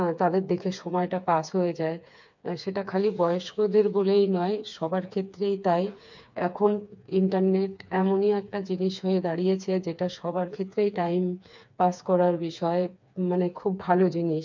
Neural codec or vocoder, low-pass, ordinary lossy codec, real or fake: codec, 16 kHz, 4 kbps, FreqCodec, smaller model; 7.2 kHz; MP3, 64 kbps; fake